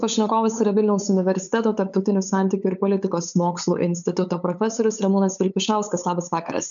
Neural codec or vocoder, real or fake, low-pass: codec, 16 kHz, 8 kbps, FunCodec, trained on LibriTTS, 25 frames a second; fake; 7.2 kHz